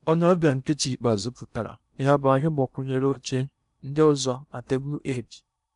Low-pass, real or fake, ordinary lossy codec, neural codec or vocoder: 10.8 kHz; fake; none; codec, 16 kHz in and 24 kHz out, 0.8 kbps, FocalCodec, streaming, 65536 codes